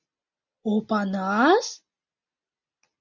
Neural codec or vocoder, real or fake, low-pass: none; real; 7.2 kHz